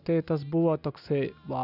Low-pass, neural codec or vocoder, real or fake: 5.4 kHz; none; real